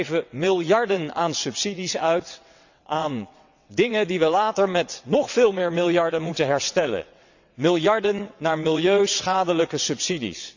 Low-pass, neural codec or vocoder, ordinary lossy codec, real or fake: 7.2 kHz; vocoder, 22.05 kHz, 80 mel bands, WaveNeXt; none; fake